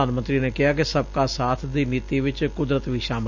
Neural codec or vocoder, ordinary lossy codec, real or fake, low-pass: none; none; real; 7.2 kHz